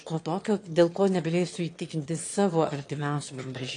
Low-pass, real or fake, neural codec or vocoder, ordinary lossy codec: 9.9 kHz; fake; autoencoder, 22.05 kHz, a latent of 192 numbers a frame, VITS, trained on one speaker; AAC, 48 kbps